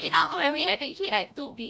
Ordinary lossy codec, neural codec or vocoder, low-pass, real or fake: none; codec, 16 kHz, 0.5 kbps, FreqCodec, larger model; none; fake